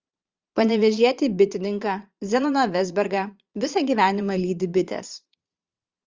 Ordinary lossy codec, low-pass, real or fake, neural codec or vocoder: Opus, 32 kbps; 7.2 kHz; fake; vocoder, 22.05 kHz, 80 mel bands, Vocos